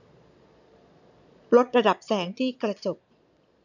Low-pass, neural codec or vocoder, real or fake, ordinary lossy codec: 7.2 kHz; vocoder, 22.05 kHz, 80 mel bands, Vocos; fake; none